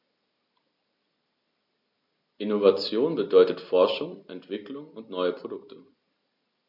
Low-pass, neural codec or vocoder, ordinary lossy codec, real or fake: 5.4 kHz; none; AAC, 48 kbps; real